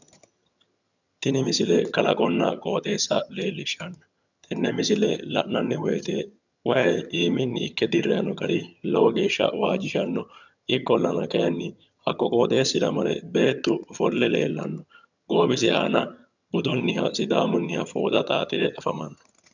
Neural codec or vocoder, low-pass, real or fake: vocoder, 22.05 kHz, 80 mel bands, HiFi-GAN; 7.2 kHz; fake